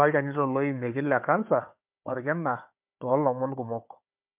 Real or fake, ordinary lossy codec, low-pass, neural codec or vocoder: fake; MP3, 32 kbps; 3.6 kHz; codec, 16 kHz, 4 kbps, FunCodec, trained on Chinese and English, 50 frames a second